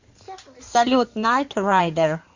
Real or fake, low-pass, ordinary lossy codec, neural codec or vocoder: fake; 7.2 kHz; Opus, 64 kbps; codec, 16 kHz in and 24 kHz out, 2.2 kbps, FireRedTTS-2 codec